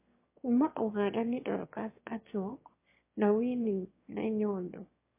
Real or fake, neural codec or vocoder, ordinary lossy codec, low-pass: fake; autoencoder, 22.05 kHz, a latent of 192 numbers a frame, VITS, trained on one speaker; MP3, 24 kbps; 3.6 kHz